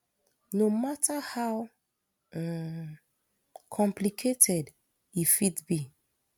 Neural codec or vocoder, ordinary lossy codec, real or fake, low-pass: none; none; real; 19.8 kHz